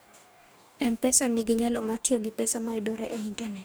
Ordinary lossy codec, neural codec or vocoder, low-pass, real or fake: none; codec, 44.1 kHz, 2.6 kbps, DAC; none; fake